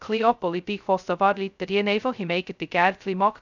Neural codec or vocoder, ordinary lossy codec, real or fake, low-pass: codec, 16 kHz, 0.2 kbps, FocalCodec; none; fake; 7.2 kHz